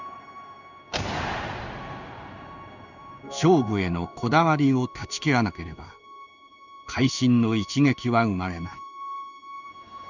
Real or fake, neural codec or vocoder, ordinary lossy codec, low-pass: fake; codec, 16 kHz in and 24 kHz out, 1 kbps, XY-Tokenizer; none; 7.2 kHz